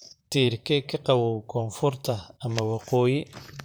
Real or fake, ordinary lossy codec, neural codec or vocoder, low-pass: fake; none; vocoder, 44.1 kHz, 128 mel bands, Pupu-Vocoder; none